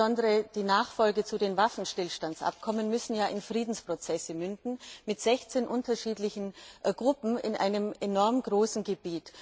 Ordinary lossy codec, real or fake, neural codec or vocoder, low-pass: none; real; none; none